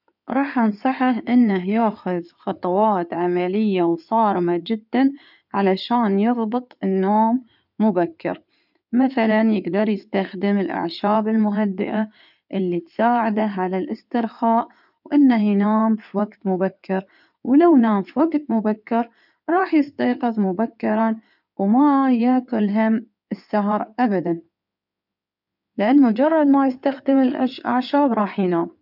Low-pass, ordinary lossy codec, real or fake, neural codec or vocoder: 5.4 kHz; none; fake; codec, 16 kHz in and 24 kHz out, 2.2 kbps, FireRedTTS-2 codec